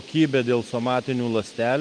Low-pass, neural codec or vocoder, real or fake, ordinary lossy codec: 9.9 kHz; none; real; Opus, 32 kbps